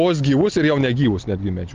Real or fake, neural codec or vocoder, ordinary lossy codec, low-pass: real; none; Opus, 32 kbps; 7.2 kHz